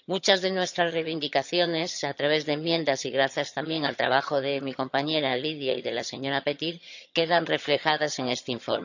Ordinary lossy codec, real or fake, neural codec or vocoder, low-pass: none; fake; vocoder, 22.05 kHz, 80 mel bands, HiFi-GAN; 7.2 kHz